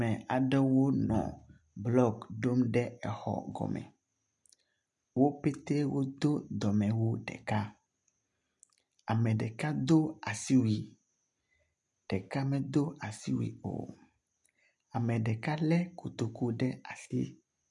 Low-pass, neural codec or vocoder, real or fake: 10.8 kHz; none; real